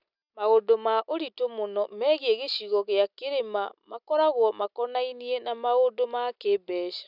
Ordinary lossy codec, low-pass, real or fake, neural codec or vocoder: none; 5.4 kHz; real; none